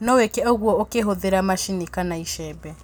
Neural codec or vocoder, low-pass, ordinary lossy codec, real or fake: none; none; none; real